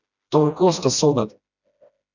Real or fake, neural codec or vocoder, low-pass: fake; codec, 16 kHz, 1 kbps, FreqCodec, smaller model; 7.2 kHz